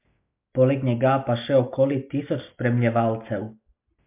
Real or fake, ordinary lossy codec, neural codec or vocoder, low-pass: real; MP3, 32 kbps; none; 3.6 kHz